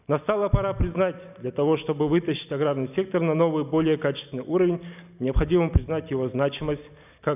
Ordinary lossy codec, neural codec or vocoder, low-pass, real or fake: none; none; 3.6 kHz; real